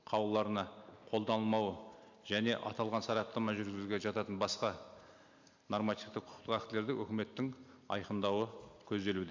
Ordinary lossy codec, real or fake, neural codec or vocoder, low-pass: MP3, 64 kbps; real; none; 7.2 kHz